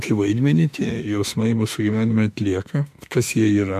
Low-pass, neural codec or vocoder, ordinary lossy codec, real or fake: 14.4 kHz; autoencoder, 48 kHz, 32 numbers a frame, DAC-VAE, trained on Japanese speech; MP3, 96 kbps; fake